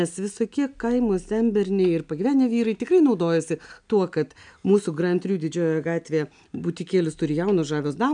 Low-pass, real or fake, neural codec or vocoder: 9.9 kHz; real; none